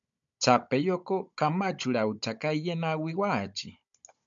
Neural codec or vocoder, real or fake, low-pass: codec, 16 kHz, 16 kbps, FunCodec, trained on Chinese and English, 50 frames a second; fake; 7.2 kHz